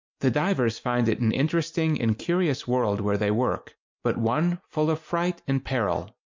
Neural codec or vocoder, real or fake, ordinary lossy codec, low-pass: none; real; MP3, 64 kbps; 7.2 kHz